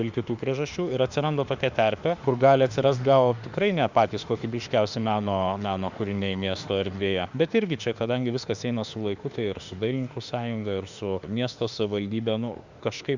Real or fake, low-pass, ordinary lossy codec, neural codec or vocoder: fake; 7.2 kHz; Opus, 64 kbps; autoencoder, 48 kHz, 32 numbers a frame, DAC-VAE, trained on Japanese speech